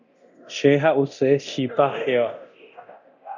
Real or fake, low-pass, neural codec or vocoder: fake; 7.2 kHz; codec, 24 kHz, 0.9 kbps, DualCodec